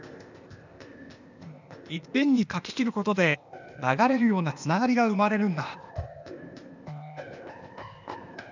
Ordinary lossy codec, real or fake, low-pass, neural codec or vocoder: none; fake; 7.2 kHz; codec, 16 kHz, 0.8 kbps, ZipCodec